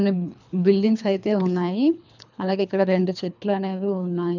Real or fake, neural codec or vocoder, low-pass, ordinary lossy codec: fake; codec, 24 kHz, 3 kbps, HILCodec; 7.2 kHz; none